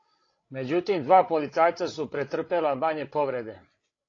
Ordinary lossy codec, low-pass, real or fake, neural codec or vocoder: AAC, 32 kbps; 7.2 kHz; fake; codec, 16 kHz, 16 kbps, FreqCodec, larger model